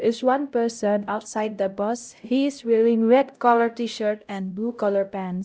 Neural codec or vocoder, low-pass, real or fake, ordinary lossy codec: codec, 16 kHz, 0.5 kbps, X-Codec, HuBERT features, trained on LibriSpeech; none; fake; none